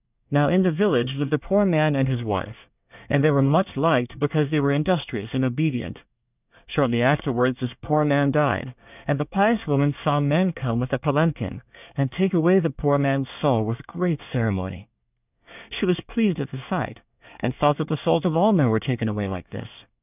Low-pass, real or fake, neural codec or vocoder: 3.6 kHz; fake; codec, 24 kHz, 1 kbps, SNAC